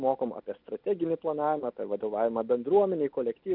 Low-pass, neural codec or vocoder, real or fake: 5.4 kHz; none; real